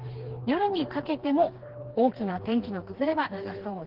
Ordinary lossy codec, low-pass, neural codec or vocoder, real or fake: Opus, 16 kbps; 5.4 kHz; codec, 16 kHz, 2 kbps, FreqCodec, smaller model; fake